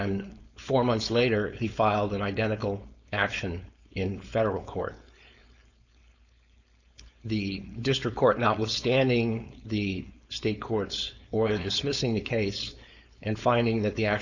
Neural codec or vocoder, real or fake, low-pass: codec, 16 kHz, 4.8 kbps, FACodec; fake; 7.2 kHz